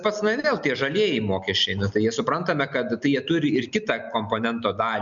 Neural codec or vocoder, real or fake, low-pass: none; real; 7.2 kHz